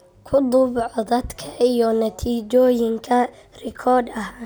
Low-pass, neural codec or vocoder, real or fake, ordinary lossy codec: none; none; real; none